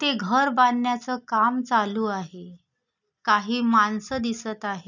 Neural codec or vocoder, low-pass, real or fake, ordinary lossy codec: none; 7.2 kHz; real; none